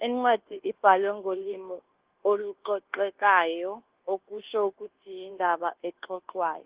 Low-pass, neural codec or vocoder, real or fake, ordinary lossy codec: 3.6 kHz; codec, 24 kHz, 1.2 kbps, DualCodec; fake; Opus, 16 kbps